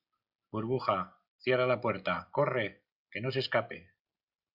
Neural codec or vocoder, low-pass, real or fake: none; 5.4 kHz; real